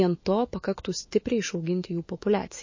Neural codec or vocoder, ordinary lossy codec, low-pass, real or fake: autoencoder, 48 kHz, 128 numbers a frame, DAC-VAE, trained on Japanese speech; MP3, 32 kbps; 7.2 kHz; fake